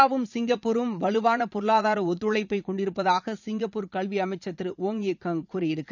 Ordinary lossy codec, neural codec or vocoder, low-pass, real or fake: none; vocoder, 44.1 kHz, 80 mel bands, Vocos; 7.2 kHz; fake